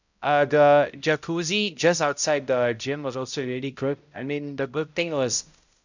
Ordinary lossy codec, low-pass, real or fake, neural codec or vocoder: none; 7.2 kHz; fake; codec, 16 kHz, 0.5 kbps, X-Codec, HuBERT features, trained on balanced general audio